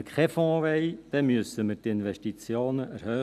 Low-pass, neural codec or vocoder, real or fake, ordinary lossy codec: 14.4 kHz; none; real; none